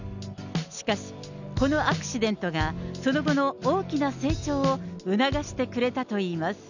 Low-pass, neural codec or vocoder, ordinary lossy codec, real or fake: 7.2 kHz; none; none; real